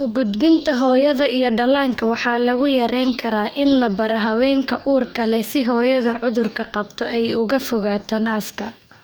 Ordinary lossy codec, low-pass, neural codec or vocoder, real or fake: none; none; codec, 44.1 kHz, 2.6 kbps, DAC; fake